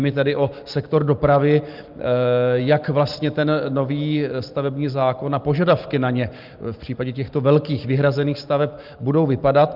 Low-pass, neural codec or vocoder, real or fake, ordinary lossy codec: 5.4 kHz; none; real; Opus, 24 kbps